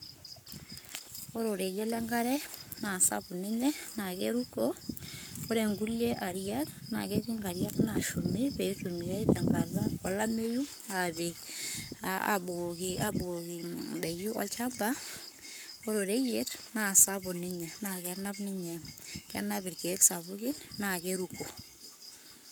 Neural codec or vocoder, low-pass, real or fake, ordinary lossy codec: codec, 44.1 kHz, 7.8 kbps, Pupu-Codec; none; fake; none